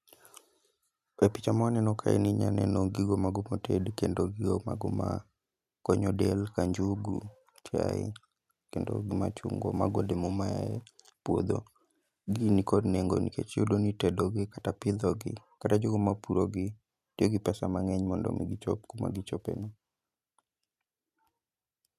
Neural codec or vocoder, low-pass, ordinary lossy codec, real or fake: none; 14.4 kHz; none; real